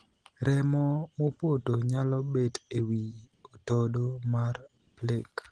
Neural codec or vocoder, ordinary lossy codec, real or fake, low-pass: none; Opus, 16 kbps; real; 10.8 kHz